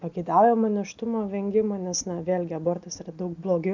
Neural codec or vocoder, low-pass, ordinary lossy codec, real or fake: none; 7.2 kHz; MP3, 64 kbps; real